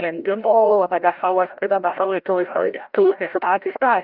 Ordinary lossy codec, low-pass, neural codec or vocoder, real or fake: Opus, 24 kbps; 5.4 kHz; codec, 16 kHz, 0.5 kbps, FreqCodec, larger model; fake